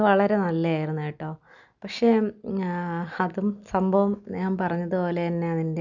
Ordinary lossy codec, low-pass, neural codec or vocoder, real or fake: none; 7.2 kHz; none; real